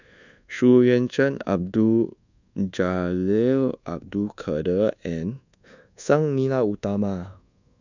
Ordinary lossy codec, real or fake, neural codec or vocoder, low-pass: none; fake; codec, 24 kHz, 1.2 kbps, DualCodec; 7.2 kHz